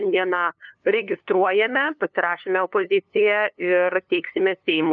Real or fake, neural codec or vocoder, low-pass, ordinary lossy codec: fake; codec, 16 kHz, 2 kbps, FunCodec, trained on LibriTTS, 25 frames a second; 7.2 kHz; MP3, 96 kbps